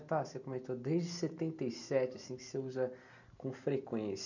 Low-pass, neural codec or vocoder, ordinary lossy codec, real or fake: 7.2 kHz; none; none; real